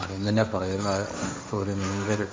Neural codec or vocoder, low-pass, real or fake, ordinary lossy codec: codec, 16 kHz, 1.1 kbps, Voila-Tokenizer; none; fake; none